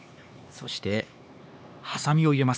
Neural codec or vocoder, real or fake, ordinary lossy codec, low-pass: codec, 16 kHz, 2 kbps, X-Codec, HuBERT features, trained on LibriSpeech; fake; none; none